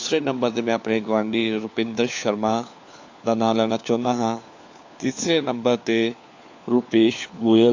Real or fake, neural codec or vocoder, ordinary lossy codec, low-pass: fake; codec, 16 kHz, 4 kbps, FunCodec, trained on LibriTTS, 50 frames a second; AAC, 48 kbps; 7.2 kHz